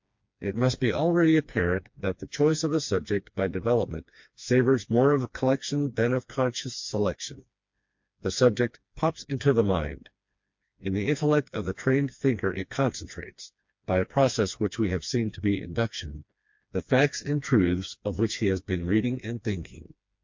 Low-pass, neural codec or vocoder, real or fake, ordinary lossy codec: 7.2 kHz; codec, 16 kHz, 2 kbps, FreqCodec, smaller model; fake; MP3, 48 kbps